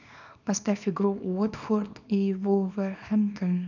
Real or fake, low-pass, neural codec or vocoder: fake; 7.2 kHz; codec, 24 kHz, 0.9 kbps, WavTokenizer, small release